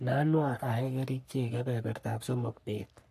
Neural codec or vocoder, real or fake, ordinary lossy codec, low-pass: codec, 44.1 kHz, 3.4 kbps, Pupu-Codec; fake; AAC, 64 kbps; 14.4 kHz